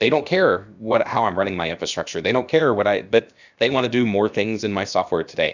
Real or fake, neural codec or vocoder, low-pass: fake; codec, 16 kHz, about 1 kbps, DyCAST, with the encoder's durations; 7.2 kHz